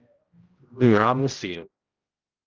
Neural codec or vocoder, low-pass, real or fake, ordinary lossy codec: codec, 16 kHz, 0.5 kbps, X-Codec, HuBERT features, trained on general audio; 7.2 kHz; fake; Opus, 32 kbps